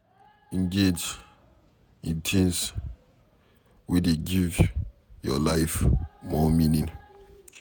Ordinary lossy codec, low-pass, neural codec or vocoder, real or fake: none; none; vocoder, 48 kHz, 128 mel bands, Vocos; fake